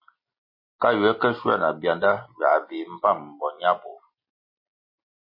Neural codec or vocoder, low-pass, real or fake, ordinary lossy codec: none; 5.4 kHz; real; MP3, 24 kbps